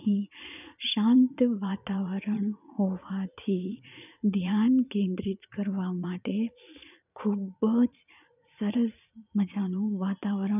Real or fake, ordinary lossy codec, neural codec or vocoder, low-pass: fake; none; vocoder, 44.1 kHz, 80 mel bands, Vocos; 3.6 kHz